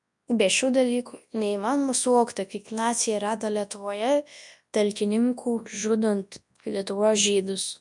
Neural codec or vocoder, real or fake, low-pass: codec, 24 kHz, 0.9 kbps, WavTokenizer, large speech release; fake; 10.8 kHz